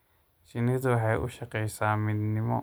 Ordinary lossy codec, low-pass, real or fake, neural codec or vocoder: none; none; real; none